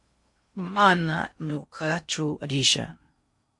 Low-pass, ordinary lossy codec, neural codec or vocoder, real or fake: 10.8 kHz; MP3, 48 kbps; codec, 16 kHz in and 24 kHz out, 0.6 kbps, FocalCodec, streaming, 2048 codes; fake